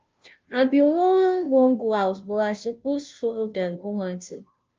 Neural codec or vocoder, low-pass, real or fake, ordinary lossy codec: codec, 16 kHz, 0.5 kbps, FunCodec, trained on Chinese and English, 25 frames a second; 7.2 kHz; fake; Opus, 24 kbps